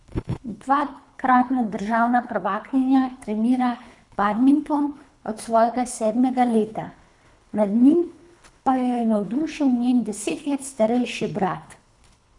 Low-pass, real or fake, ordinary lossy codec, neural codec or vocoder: 10.8 kHz; fake; none; codec, 24 kHz, 3 kbps, HILCodec